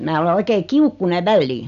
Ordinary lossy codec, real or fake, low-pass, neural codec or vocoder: none; real; 7.2 kHz; none